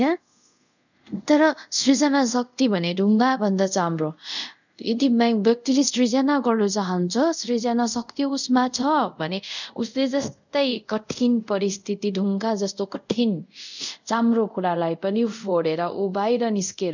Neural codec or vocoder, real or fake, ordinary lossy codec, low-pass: codec, 24 kHz, 0.5 kbps, DualCodec; fake; none; 7.2 kHz